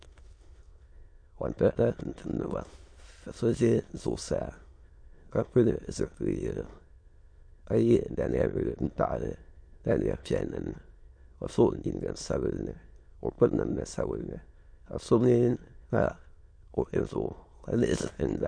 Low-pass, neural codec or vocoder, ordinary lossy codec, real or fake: 9.9 kHz; autoencoder, 22.05 kHz, a latent of 192 numbers a frame, VITS, trained on many speakers; MP3, 48 kbps; fake